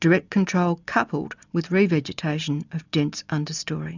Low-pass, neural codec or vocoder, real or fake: 7.2 kHz; none; real